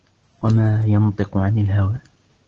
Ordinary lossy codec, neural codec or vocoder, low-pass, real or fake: Opus, 16 kbps; none; 7.2 kHz; real